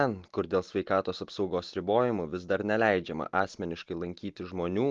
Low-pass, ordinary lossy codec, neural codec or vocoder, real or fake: 7.2 kHz; Opus, 32 kbps; none; real